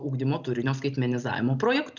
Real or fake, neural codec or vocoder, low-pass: real; none; 7.2 kHz